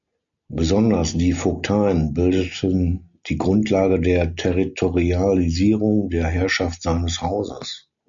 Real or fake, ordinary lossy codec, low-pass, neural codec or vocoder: real; MP3, 64 kbps; 7.2 kHz; none